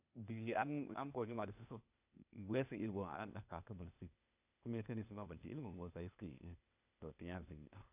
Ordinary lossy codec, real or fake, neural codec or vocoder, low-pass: none; fake; codec, 16 kHz, 0.8 kbps, ZipCodec; 3.6 kHz